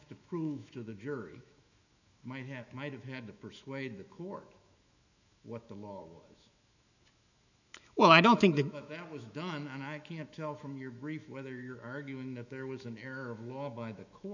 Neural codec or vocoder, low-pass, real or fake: autoencoder, 48 kHz, 128 numbers a frame, DAC-VAE, trained on Japanese speech; 7.2 kHz; fake